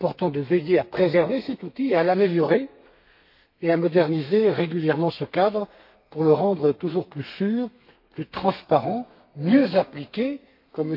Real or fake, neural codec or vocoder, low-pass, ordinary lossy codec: fake; codec, 32 kHz, 1.9 kbps, SNAC; 5.4 kHz; MP3, 32 kbps